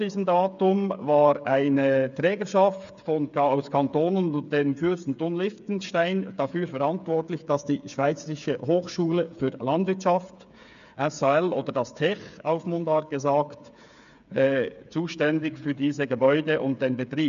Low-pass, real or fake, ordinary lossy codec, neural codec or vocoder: 7.2 kHz; fake; none; codec, 16 kHz, 8 kbps, FreqCodec, smaller model